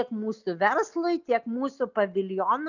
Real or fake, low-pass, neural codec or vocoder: real; 7.2 kHz; none